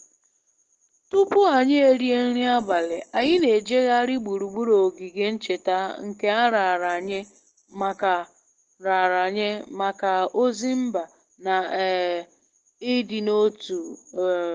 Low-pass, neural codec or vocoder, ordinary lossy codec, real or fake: 7.2 kHz; none; Opus, 16 kbps; real